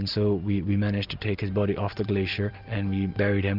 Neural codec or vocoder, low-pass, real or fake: none; 5.4 kHz; real